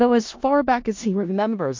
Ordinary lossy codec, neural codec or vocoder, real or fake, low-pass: MP3, 48 kbps; codec, 16 kHz in and 24 kHz out, 0.4 kbps, LongCat-Audio-Codec, four codebook decoder; fake; 7.2 kHz